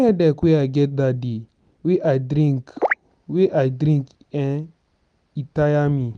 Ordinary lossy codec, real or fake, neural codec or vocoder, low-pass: none; real; none; 9.9 kHz